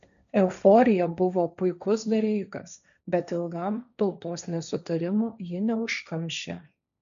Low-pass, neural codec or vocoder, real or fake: 7.2 kHz; codec, 16 kHz, 1.1 kbps, Voila-Tokenizer; fake